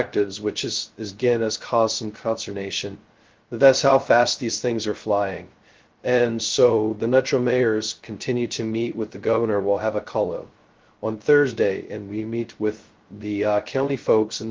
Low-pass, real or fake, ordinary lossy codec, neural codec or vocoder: 7.2 kHz; fake; Opus, 16 kbps; codec, 16 kHz, 0.2 kbps, FocalCodec